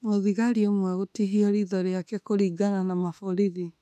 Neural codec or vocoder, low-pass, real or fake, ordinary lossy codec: autoencoder, 48 kHz, 32 numbers a frame, DAC-VAE, trained on Japanese speech; 14.4 kHz; fake; none